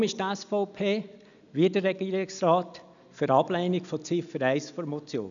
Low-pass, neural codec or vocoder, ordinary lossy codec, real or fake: 7.2 kHz; none; none; real